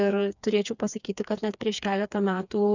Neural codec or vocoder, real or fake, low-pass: codec, 16 kHz, 4 kbps, FreqCodec, smaller model; fake; 7.2 kHz